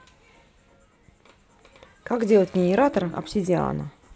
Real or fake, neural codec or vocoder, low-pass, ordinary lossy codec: real; none; none; none